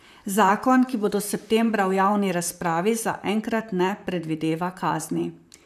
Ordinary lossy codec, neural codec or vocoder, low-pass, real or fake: none; vocoder, 44.1 kHz, 128 mel bands, Pupu-Vocoder; 14.4 kHz; fake